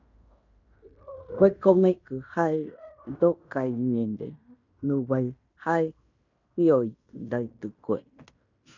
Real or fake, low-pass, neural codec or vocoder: fake; 7.2 kHz; codec, 16 kHz in and 24 kHz out, 0.9 kbps, LongCat-Audio-Codec, fine tuned four codebook decoder